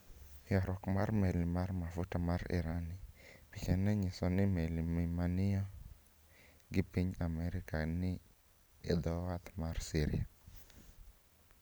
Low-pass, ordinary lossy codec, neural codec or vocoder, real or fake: none; none; none; real